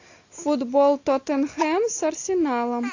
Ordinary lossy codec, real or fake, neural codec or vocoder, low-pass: AAC, 48 kbps; real; none; 7.2 kHz